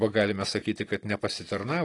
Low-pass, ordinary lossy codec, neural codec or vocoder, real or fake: 10.8 kHz; AAC, 32 kbps; none; real